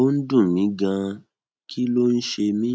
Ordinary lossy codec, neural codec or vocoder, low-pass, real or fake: none; none; none; real